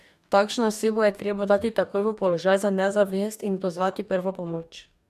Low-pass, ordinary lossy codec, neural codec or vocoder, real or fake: 14.4 kHz; none; codec, 44.1 kHz, 2.6 kbps, DAC; fake